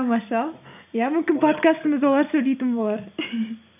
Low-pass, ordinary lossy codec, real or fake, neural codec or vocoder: 3.6 kHz; none; real; none